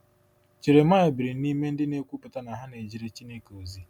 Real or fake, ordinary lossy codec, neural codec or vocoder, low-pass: real; none; none; 19.8 kHz